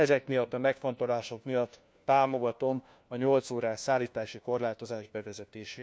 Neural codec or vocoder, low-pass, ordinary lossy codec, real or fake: codec, 16 kHz, 1 kbps, FunCodec, trained on LibriTTS, 50 frames a second; none; none; fake